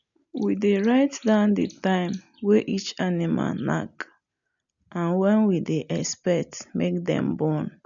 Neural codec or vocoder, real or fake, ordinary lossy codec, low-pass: none; real; none; 7.2 kHz